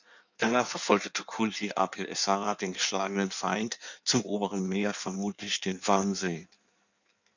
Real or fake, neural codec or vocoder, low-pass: fake; codec, 16 kHz in and 24 kHz out, 1.1 kbps, FireRedTTS-2 codec; 7.2 kHz